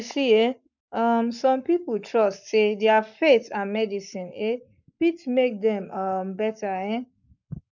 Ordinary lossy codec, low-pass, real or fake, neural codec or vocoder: none; 7.2 kHz; fake; codec, 44.1 kHz, 7.8 kbps, Pupu-Codec